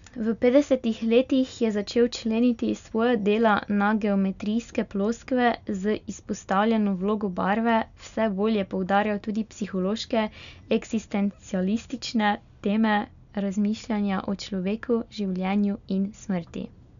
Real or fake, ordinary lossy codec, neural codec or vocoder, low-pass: real; none; none; 7.2 kHz